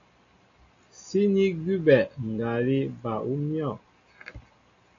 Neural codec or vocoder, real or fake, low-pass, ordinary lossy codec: none; real; 7.2 kHz; AAC, 48 kbps